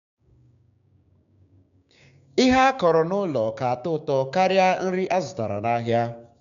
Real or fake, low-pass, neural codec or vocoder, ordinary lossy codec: fake; 7.2 kHz; codec, 16 kHz, 6 kbps, DAC; none